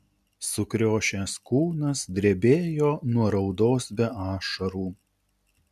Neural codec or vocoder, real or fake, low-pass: none; real; 14.4 kHz